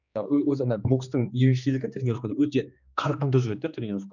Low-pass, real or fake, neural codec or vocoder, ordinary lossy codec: 7.2 kHz; fake; codec, 16 kHz, 2 kbps, X-Codec, HuBERT features, trained on general audio; none